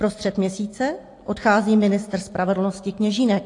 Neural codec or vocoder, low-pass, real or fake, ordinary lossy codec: none; 10.8 kHz; real; AAC, 48 kbps